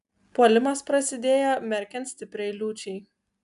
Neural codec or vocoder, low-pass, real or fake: none; 10.8 kHz; real